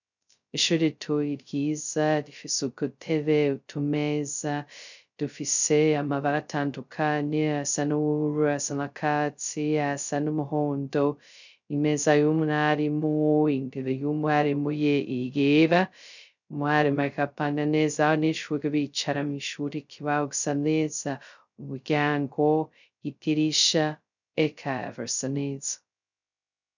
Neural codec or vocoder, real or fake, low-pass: codec, 16 kHz, 0.2 kbps, FocalCodec; fake; 7.2 kHz